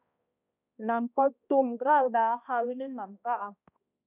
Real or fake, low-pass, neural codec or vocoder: fake; 3.6 kHz; codec, 16 kHz, 1 kbps, X-Codec, HuBERT features, trained on balanced general audio